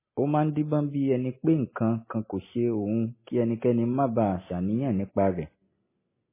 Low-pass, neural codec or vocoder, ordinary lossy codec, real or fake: 3.6 kHz; none; MP3, 16 kbps; real